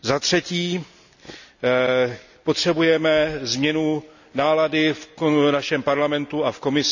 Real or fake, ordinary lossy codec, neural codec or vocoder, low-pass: real; none; none; 7.2 kHz